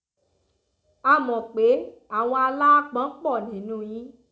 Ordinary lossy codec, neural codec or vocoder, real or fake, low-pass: none; none; real; none